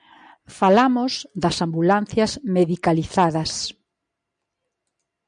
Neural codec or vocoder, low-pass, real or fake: none; 9.9 kHz; real